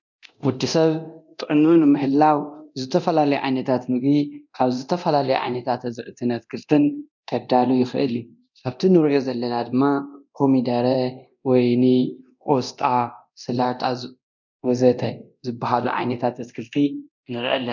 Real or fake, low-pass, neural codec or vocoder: fake; 7.2 kHz; codec, 24 kHz, 0.9 kbps, DualCodec